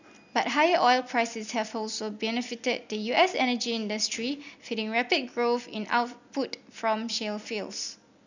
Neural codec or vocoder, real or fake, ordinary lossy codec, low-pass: none; real; none; 7.2 kHz